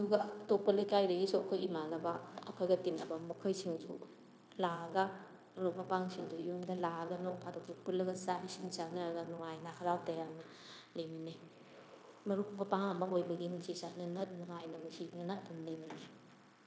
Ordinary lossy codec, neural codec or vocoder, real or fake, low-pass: none; codec, 16 kHz, 0.9 kbps, LongCat-Audio-Codec; fake; none